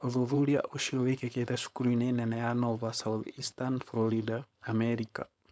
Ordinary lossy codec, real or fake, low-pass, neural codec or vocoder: none; fake; none; codec, 16 kHz, 4.8 kbps, FACodec